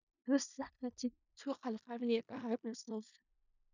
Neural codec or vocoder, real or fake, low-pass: codec, 16 kHz in and 24 kHz out, 0.4 kbps, LongCat-Audio-Codec, four codebook decoder; fake; 7.2 kHz